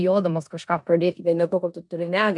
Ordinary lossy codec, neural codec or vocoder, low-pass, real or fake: MP3, 64 kbps; codec, 16 kHz in and 24 kHz out, 0.9 kbps, LongCat-Audio-Codec, four codebook decoder; 10.8 kHz; fake